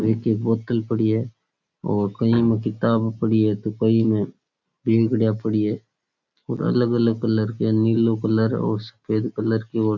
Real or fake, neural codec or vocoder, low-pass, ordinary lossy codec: real; none; 7.2 kHz; none